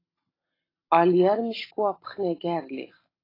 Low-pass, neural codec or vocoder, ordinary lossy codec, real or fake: 5.4 kHz; none; AAC, 24 kbps; real